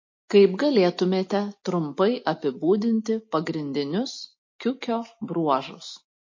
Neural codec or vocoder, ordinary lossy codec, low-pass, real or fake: none; MP3, 32 kbps; 7.2 kHz; real